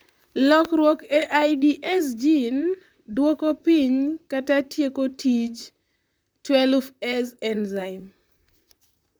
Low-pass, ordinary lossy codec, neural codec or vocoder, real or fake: none; none; vocoder, 44.1 kHz, 128 mel bands, Pupu-Vocoder; fake